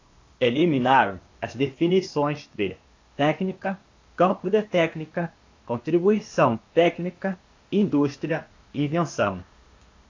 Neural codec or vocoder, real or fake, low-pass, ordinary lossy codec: codec, 16 kHz, 0.8 kbps, ZipCodec; fake; 7.2 kHz; AAC, 48 kbps